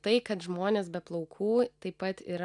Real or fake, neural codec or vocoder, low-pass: real; none; 10.8 kHz